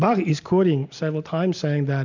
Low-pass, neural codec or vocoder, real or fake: 7.2 kHz; none; real